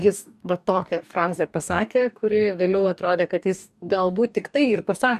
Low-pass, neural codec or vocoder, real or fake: 14.4 kHz; codec, 44.1 kHz, 2.6 kbps, DAC; fake